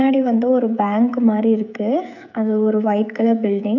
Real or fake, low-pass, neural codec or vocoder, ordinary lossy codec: fake; 7.2 kHz; codec, 16 kHz, 16 kbps, FreqCodec, smaller model; none